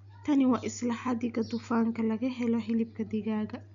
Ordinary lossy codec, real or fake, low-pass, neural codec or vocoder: none; real; 7.2 kHz; none